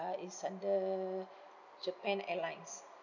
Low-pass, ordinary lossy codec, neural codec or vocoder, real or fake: 7.2 kHz; none; none; real